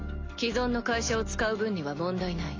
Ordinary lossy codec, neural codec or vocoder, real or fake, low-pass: AAC, 32 kbps; none; real; 7.2 kHz